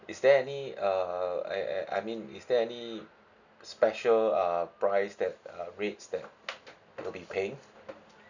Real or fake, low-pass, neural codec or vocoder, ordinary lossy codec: real; 7.2 kHz; none; none